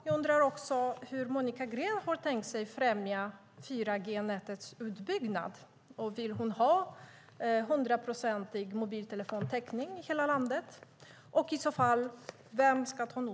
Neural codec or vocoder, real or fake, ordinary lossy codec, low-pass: none; real; none; none